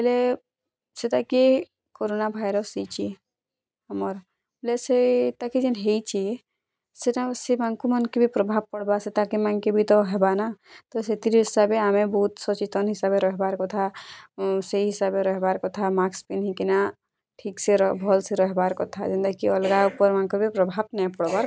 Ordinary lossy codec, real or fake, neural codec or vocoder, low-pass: none; real; none; none